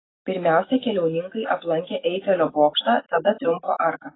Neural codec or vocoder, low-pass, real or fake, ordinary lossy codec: autoencoder, 48 kHz, 128 numbers a frame, DAC-VAE, trained on Japanese speech; 7.2 kHz; fake; AAC, 16 kbps